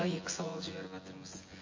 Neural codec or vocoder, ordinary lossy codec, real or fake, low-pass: vocoder, 24 kHz, 100 mel bands, Vocos; MP3, 32 kbps; fake; 7.2 kHz